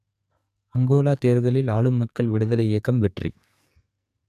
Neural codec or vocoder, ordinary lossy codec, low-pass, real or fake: codec, 44.1 kHz, 2.6 kbps, SNAC; none; 14.4 kHz; fake